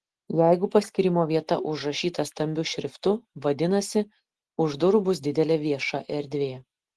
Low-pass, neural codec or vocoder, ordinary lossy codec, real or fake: 10.8 kHz; none; Opus, 16 kbps; real